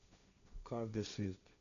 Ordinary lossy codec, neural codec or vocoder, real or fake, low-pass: none; codec, 16 kHz, 1.1 kbps, Voila-Tokenizer; fake; 7.2 kHz